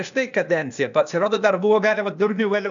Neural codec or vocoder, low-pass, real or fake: codec, 16 kHz, 0.8 kbps, ZipCodec; 7.2 kHz; fake